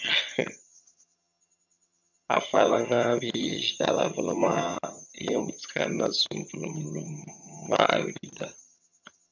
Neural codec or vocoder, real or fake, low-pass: vocoder, 22.05 kHz, 80 mel bands, HiFi-GAN; fake; 7.2 kHz